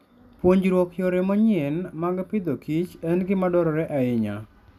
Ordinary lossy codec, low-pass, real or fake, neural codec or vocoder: none; 14.4 kHz; real; none